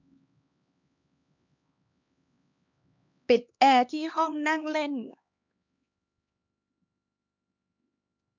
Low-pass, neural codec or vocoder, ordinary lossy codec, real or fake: 7.2 kHz; codec, 16 kHz, 2 kbps, X-Codec, HuBERT features, trained on LibriSpeech; none; fake